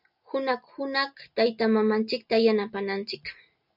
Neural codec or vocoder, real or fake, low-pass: none; real; 5.4 kHz